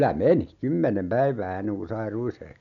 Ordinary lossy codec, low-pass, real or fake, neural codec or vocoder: none; 7.2 kHz; real; none